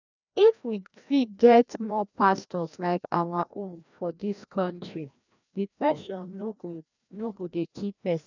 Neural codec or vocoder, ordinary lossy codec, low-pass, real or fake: codec, 16 kHz, 1 kbps, FreqCodec, larger model; none; 7.2 kHz; fake